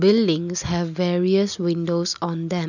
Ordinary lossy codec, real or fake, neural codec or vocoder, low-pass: none; real; none; 7.2 kHz